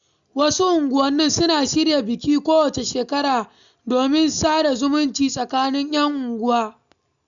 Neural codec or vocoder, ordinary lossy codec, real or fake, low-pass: none; none; real; 7.2 kHz